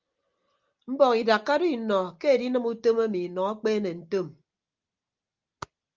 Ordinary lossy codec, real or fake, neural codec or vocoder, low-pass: Opus, 24 kbps; real; none; 7.2 kHz